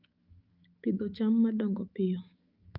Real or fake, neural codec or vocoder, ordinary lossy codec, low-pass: fake; autoencoder, 48 kHz, 128 numbers a frame, DAC-VAE, trained on Japanese speech; Opus, 24 kbps; 5.4 kHz